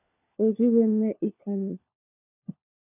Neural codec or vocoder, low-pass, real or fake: codec, 16 kHz, 4 kbps, FunCodec, trained on LibriTTS, 50 frames a second; 3.6 kHz; fake